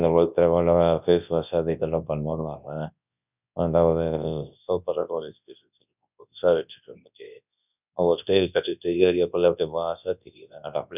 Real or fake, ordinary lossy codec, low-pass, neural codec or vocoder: fake; none; 3.6 kHz; codec, 24 kHz, 0.9 kbps, WavTokenizer, large speech release